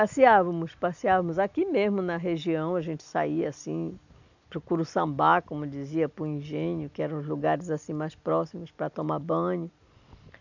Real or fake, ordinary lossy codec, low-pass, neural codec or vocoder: real; none; 7.2 kHz; none